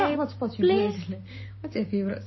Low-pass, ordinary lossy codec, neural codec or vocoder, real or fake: 7.2 kHz; MP3, 24 kbps; codec, 16 kHz, 6 kbps, DAC; fake